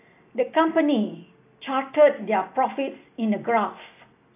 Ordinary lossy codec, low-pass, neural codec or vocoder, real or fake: AAC, 24 kbps; 3.6 kHz; none; real